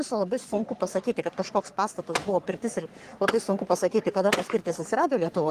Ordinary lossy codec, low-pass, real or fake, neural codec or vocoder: Opus, 24 kbps; 14.4 kHz; fake; codec, 44.1 kHz, 3.4 kbps, Pupu-Codec